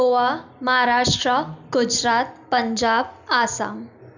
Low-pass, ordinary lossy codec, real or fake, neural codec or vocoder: 7.2 kHz; none; real; none